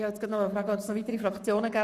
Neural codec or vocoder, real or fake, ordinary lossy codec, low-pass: codec, 44.1 kHz, 7.8 kbps, Pupu-Codec; fake; none; 14.4 kHz